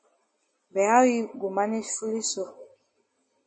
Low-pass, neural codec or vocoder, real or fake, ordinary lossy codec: 9.9 kHz; none; real; MP3, 32 kbps